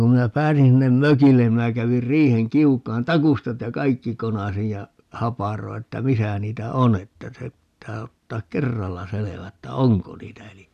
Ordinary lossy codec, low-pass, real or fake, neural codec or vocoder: none; 14.4 kHz; real; none